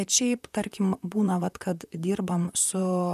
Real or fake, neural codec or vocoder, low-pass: fake; vocoder, 44.1 kHz, 128 mel bands, Pupu-Vocoder; 14.4 kHz